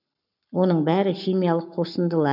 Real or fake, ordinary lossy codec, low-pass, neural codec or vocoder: fake; none; 5.4 kHz; codec, 44.1 kHz, 7.8 kbps, Pupu-Codec